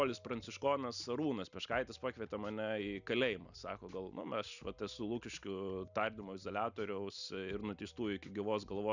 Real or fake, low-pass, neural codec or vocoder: real; 7.2 kHz; none